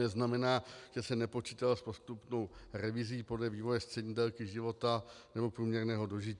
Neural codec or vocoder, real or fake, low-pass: none; real; 10.8 kHz